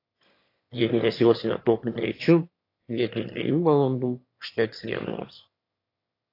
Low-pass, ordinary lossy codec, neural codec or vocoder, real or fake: 5.4 kHz; AAC, 32 kbps; autoencoder, 22.05 kHz, a latent of 192 numbers a frame, VITS, trained on one speaker; fake